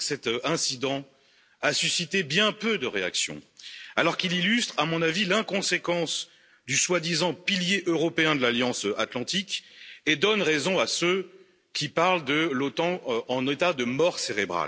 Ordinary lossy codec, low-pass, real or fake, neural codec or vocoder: none; none; real; none